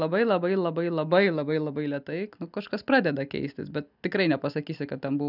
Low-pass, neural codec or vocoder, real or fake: 5.4 kHz; none; real